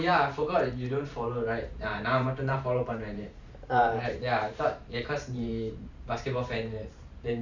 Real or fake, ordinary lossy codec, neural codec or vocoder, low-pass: fake; none; vocoder, 44.1 kHz, 128 mel bands every 512 samples, BigVGAN v2; 7.2 kHz